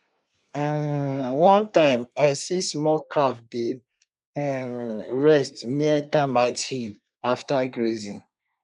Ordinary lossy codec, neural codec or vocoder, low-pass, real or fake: none; codec, 24 kHz, 1 kbps, SNAC; 10.8 kHz; fake